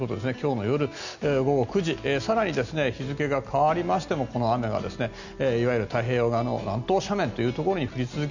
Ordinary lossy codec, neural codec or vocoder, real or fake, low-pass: none; none; real; 7.2 kHz